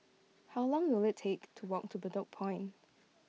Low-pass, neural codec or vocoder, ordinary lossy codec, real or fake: none; none; none; real